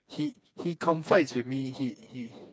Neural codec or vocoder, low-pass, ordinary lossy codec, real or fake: codec, 16 kHz, 2 kbps, FreqCodec, smaller model; none; none; fake